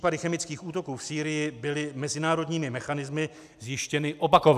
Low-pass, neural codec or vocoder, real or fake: 14.4 kHz; none; real